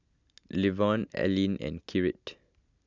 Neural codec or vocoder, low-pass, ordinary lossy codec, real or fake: none; 7.2 kHz; none; real